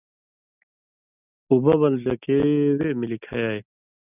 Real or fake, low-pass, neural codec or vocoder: real; 3.6 kHz; none